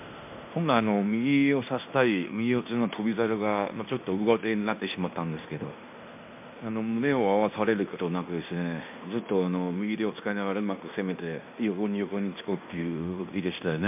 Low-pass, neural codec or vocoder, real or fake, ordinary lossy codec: 3.6 kHz; codec, 16 kHz in and 24 kHz out, 0.9 kbps, LongCat-Audio-Codec, fine tuned four codebook decoder; fake; MP3, 32 kbps